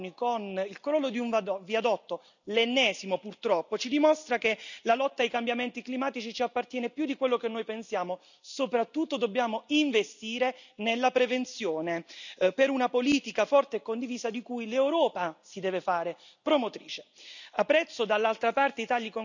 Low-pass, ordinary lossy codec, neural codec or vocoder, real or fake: 7.2 kHz; none; none; real